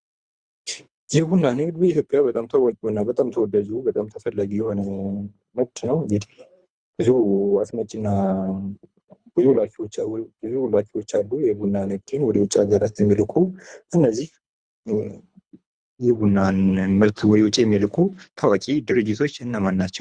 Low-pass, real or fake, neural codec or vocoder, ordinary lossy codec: 9.9 kHz; fake; codec, 24 kHz, 3 kbps, HILCodec; Opus, 64 kbps